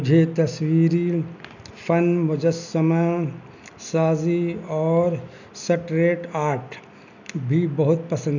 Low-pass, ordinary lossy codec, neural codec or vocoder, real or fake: 7.2 kHz; none; none; real